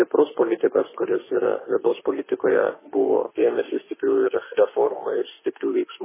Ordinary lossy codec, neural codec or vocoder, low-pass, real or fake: MP3, 16 kbps; autoencoder, 48 kHz, 32 numbers a frame, DAC-VAE, trained on Japanese speech; 3.6 kHz; fake